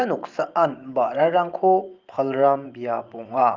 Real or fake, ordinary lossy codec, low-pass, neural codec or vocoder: fake; Opus, 32 kbps; 7.2 kHz; vocoder, 44.1 kHz, 128 mel bands every 512 samples, BigVGAN v2